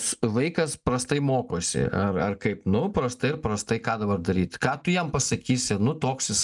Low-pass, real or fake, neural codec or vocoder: 10.8 kHz; real; none